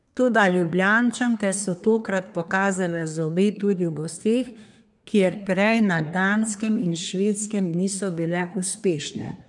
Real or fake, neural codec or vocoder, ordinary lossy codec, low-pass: fake; codec, 24 kHz, 1 kbps, SNAC; none; 10.8 kHz